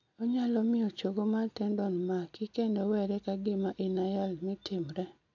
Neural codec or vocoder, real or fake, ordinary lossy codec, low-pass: none; real; AAC, 48 kbps; 7.2 kHz